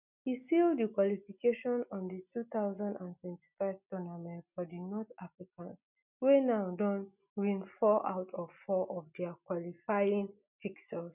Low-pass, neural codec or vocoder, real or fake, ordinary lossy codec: 3.6 kHz; none; real; none